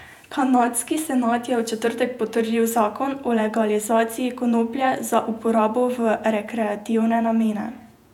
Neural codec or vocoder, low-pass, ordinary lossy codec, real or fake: vocoder, 48 kHz, 128 mel bands, Vocos; 19.8 kHz; none; fake